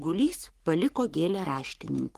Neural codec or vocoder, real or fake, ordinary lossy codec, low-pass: codec, 44.1 kHz, 3.4 kbps, Pupu-Codec; fake; Opus, 24 kbps; 14.4 kHz